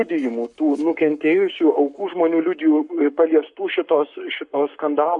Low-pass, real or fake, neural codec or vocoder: 10.8 kHz; fake; codec, 44.1 kHz, 7.8 kbps, Pupu-Codec